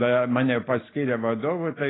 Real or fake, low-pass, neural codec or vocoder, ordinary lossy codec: real; 7.2 kHz; none; AAC, 16 kbps